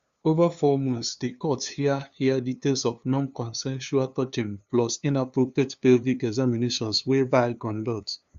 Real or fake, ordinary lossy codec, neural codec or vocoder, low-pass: fake; Opus, 64 kbps; codec, 16 kHz, 2 kbps, FunCodec, trained on LibriTTS, 25 frames a second; 7.2 kHz